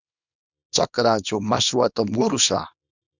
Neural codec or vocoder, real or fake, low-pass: codec, 24 kHz, 0.9 kbps, WavTokenizer, small release; fake; 7.2 kHz